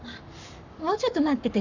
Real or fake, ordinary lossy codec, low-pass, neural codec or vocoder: fake; none; 7.2 kHz; codec, 44.1 kHz, 7.8 kbps, Pupu-Codec